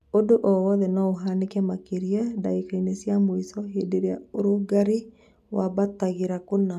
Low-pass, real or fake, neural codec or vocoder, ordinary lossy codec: 14.4 kHz; real; none; none